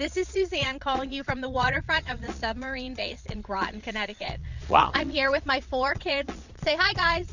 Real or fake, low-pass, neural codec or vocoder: fake; 7.2 kHz; vocoder, 22.05 kHz, 80 mel bands, Vocos